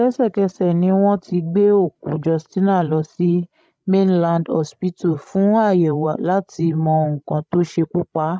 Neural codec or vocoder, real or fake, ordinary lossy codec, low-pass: codec, 16 kHz, 16 kbps, FreqCodec, larger model; fake; none; none